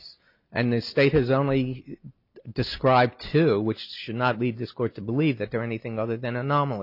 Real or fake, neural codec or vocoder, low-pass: real; none; 5.4 kHz